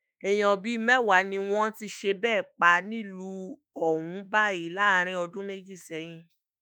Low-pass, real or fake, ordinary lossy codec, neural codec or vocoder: none; fake; none; autoencoder, 48 kHz, 32 numbers a frame, DAC-VAE, trained on Japanese speech